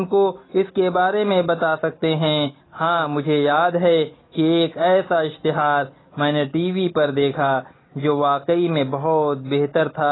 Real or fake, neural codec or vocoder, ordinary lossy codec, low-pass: real; none; AAC, 16 kbps; 7.2 kHz